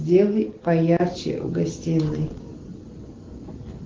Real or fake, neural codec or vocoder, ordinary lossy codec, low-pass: real; none; Opus, 16 kbps; 7.2 kHz